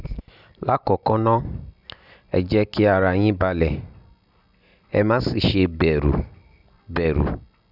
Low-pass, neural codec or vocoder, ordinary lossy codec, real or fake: 5.4 kHz; none; none; real